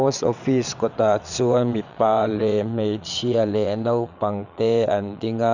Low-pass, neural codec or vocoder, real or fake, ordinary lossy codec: 7.2 kHz; vocoder, 22.05 kHz, 80 mel bands, WaveNeXt; fake; none